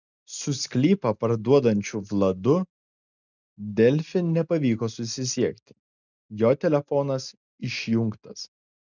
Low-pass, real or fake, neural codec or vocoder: 7.2 kHz; real; none